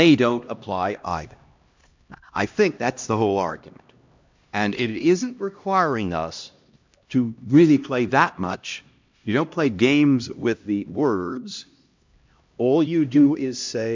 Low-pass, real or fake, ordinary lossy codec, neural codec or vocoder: 7.2 kHz; fake; MP3, 64 kbps; codec, 16 kHz, 1 kbps, X-Codec, HuBERT features, trained on LibriSpeech